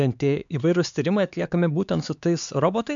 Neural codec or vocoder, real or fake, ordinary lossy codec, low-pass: codec, 16 kHz, 4 kbps, X-Codec, HuBERT features, trained on LibriSpeech; fake; MP3, 64 kbps; 7.2 kHz